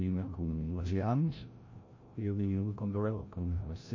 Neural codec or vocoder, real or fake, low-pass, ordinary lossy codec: codec, 16 kHz, 0.5 kbps, FreqCodec, larger model; fake; 7.2 kHz; MP3, 32 kbps